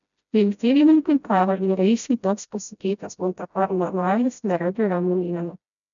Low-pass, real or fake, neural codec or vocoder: 7.2 kHz; fake; codec, 16 kHz, 0.5 kbps, FreqCodec, smaller model